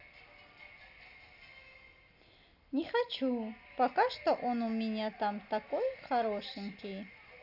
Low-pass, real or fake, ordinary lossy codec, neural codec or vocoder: 5.4 kHz; real; none; none